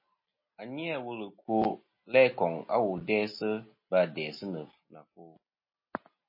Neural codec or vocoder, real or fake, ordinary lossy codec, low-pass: none; real; MP3, 24 kbps; 5.4 kHz